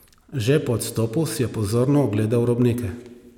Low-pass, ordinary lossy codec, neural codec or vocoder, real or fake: 19.8 kHz; none; none; real